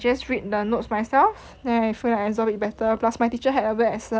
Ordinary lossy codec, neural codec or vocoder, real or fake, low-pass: none; none; real; none